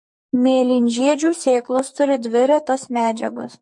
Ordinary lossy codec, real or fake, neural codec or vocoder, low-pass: MP3, 48 kbps; fake; codec, 44.1 kHz, 2.6 kbps, SNAC; 10.8 kHz